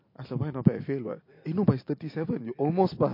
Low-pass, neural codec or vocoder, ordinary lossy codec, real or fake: 5.4 kHz; none; MP3, 32 kbps; real